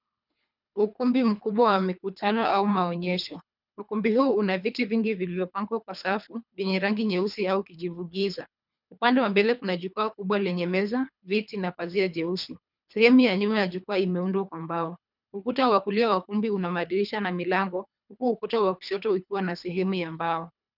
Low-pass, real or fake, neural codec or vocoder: 5.4 kHz; fake; codec, 24 kHz, 3 kbps, HILCodec